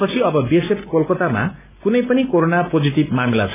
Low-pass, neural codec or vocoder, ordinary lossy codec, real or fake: 3.6 kHz; none; none; real